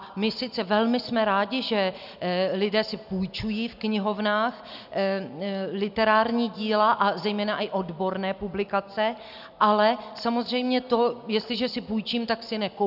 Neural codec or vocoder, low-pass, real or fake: none; 5.4 kHz; real